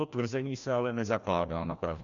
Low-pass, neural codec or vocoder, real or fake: 7.2 kHz; codec, 16 kHz, 1 kbps, FreqCodec, larger model; fake